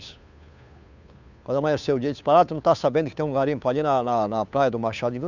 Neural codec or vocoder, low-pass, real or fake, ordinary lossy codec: codec, 16 kHz, 2 kbps, FunCodec, trained on Chinese and English, 25 frames a second; 7.2 kHz; fake; none